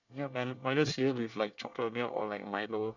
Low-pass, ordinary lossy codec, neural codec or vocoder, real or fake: 7.2 kHz; none; codec, 24 kHz, 1 kbps, SNAC; fake